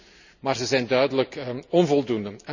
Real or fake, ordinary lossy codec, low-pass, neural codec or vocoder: real; none; 7.2 kHz; none